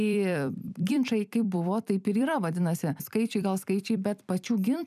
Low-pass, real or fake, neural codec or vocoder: 14.4 kHz; fake; vocoder, 44.1 kHz, 128 mel bands every 256 samples, BigVGAN v2